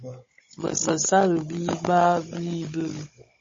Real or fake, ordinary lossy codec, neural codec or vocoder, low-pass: fake; MP3, 32 kbps; codec, 16 kHz, 16 kbps, FunCodec, trained on Chinese and English, 50 frames a second; 7.2 kHz